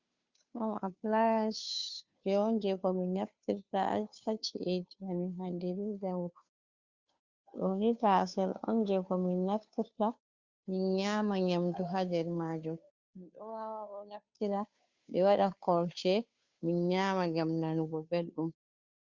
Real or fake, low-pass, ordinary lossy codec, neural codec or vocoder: fake; 7.2 kHz; Opus, 64 kbps; codec, 16 kHz, 2 kbps, FunCodec, trained on Chinese and English, 25 frames a second